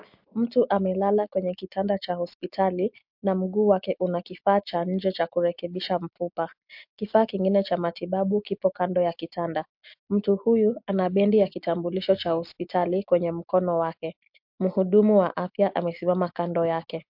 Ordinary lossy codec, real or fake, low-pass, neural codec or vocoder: MP3, 48 kbps; real; 5.4 kHz; none